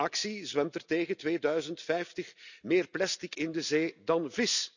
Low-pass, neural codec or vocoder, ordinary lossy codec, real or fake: 7.2 kHz; none; none; real